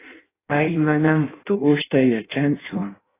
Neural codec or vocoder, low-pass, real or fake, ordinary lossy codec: codec, 16 kHz in and 24 kHz out, 0.6 kbps, FireRedTTS-2 codec; 3.6 kHz; fake; AAC, 16 kbps